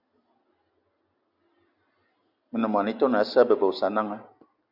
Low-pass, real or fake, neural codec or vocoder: 5.4 kHz; real; none